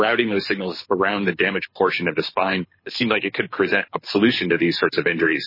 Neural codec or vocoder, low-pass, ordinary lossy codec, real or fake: codec, 24 kHz, 6 kbps, HILCodec; 5.4 kHz; MP3, 24 kbps; fake